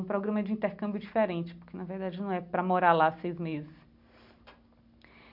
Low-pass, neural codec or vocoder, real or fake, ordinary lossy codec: 5.4 kHz; none; real; none